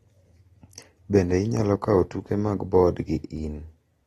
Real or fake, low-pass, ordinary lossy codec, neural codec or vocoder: real; 19.8 kHz; AAC, 32 kbps; none